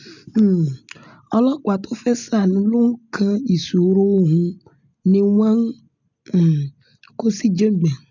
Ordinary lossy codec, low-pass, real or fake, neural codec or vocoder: none; 7.2 kHz; real; none